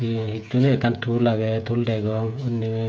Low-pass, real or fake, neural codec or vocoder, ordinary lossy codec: none; fake; codec, 16 kHz, 16 kbps, FreqCodec, smaller model; none